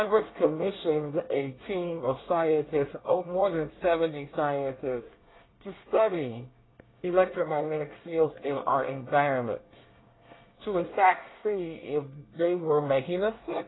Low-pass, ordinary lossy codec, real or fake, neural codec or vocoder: 7.2 kHz; AAC, 16 kbps; fake; codec, 24 kHz, 1 kbps, SNAC